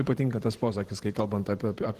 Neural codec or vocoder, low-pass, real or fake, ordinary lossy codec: vocoder, 44.1 kHz, 128 mel bands every 512 samples, BigVGAN v2; 14.4 kHz; fake; Opus, 16 kbps